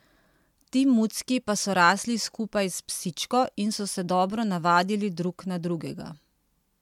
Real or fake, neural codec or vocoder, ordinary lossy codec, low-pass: real; none; MP3, 96 kbps; 19.8 kHz